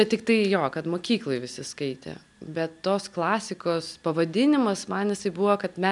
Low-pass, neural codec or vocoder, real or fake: 10.8 kHz; none; real